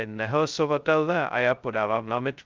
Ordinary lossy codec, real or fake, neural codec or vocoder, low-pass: Opus, 32 kbps; fake; codec, 16 kHz, 0.2 kbps, FocalCodec; 7.2 kHz